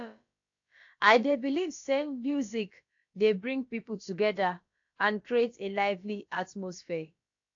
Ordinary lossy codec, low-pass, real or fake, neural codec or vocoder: AAC, 48 kbps; 7.2 kHz; fake; codec, 16 kHz, about 1 kbps, DyCAST, with the encoder's durations